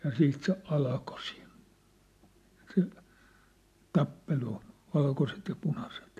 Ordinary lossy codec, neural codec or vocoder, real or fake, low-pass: AAC, 64 kbps; none; real; 14.4 kHz